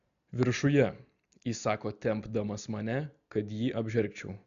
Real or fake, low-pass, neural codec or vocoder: real; 7.2 kHz; none